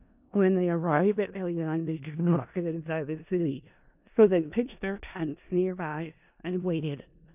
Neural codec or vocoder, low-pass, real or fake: codec, 16 kHz in and 24 kHz out, 0.4 kbps, LongCat-Audio-Codec, four codebook decoder; 3.6 kHz; fake